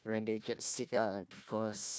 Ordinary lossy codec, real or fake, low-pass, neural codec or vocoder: none; fake; none; codec, 16 kHz, 1 kbps, FunCodec, trained on Chinese and English, 50 frames a second